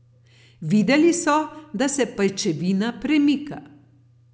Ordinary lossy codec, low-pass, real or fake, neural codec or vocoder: none; none; real; none